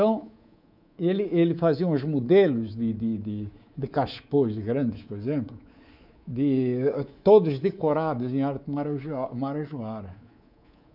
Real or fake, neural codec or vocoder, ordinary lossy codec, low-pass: fake; codec, 24 kHz, 3.1 kbps, DualCodec; none; 5.4 kHz